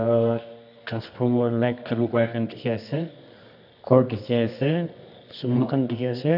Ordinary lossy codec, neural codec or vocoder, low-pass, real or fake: none; codec, 24 kHz, 0.9 kbps, WavTokenizer, medium music audio release; 5.4 kHz; fake